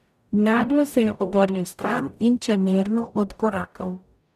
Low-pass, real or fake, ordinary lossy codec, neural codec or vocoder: 14.4 kHz; fake; none; codec, 44.1 kHz, 0.9 kbps, DAC